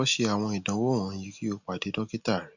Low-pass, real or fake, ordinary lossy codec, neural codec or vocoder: 7.2 kHz; real; none; none